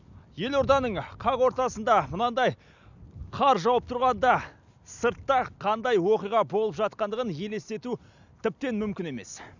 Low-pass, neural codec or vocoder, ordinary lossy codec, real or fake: 7.2 kHz; none; none; real